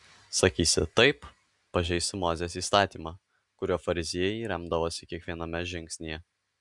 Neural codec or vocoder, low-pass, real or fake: none; 10.8 kHz; real